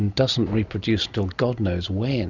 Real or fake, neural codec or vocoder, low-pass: real; none; 7.2 kHz